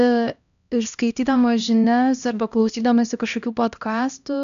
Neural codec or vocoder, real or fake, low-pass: codec, 16 kHz, 1 kbps, X-Codec, HuBERT features, trained on LibriSpeech; fake; 7.2 kHz